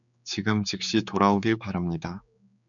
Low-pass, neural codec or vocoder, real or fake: 7.2 kHz; codec, 16 kHz, 4 kbps, X-Codec, HuBERT features, trained on general audio; fake